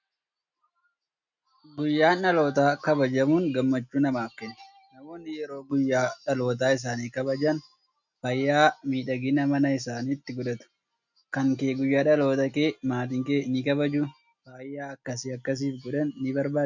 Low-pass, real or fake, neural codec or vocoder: 7.2 kHz; real; none